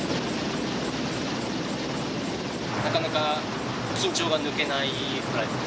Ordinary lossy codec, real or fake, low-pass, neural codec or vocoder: none; real; none; none